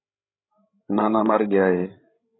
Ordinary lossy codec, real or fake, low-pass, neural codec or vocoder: AAC, 16 kbps; fake; 7.2 kHz; codec, 16 kHz, 16 kbps, FreqCodec, larger model